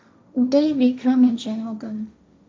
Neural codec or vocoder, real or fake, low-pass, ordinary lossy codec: codec, 16 kHz, 1.1 kbps, Voila-Tokenizer; fake; none; none